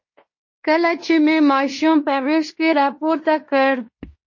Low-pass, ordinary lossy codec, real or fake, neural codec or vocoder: 7.2 kHz; MP3, 32 kbps; fake; codec, 16 kHz in and 24 kHz out, 0.9 kbps, LongCat-Audio-Codec, fine tuned four codebook decoder